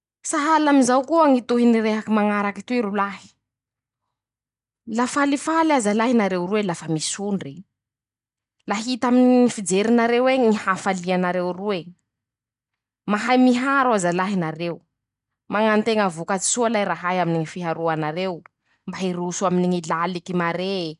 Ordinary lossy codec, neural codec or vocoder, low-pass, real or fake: none; none; 10.8 kHz; real